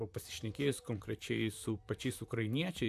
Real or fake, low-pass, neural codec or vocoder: fake; 14.4 kHz; vocoder, 44.1 kHz, 128 mel bands, Pupu-Vocoder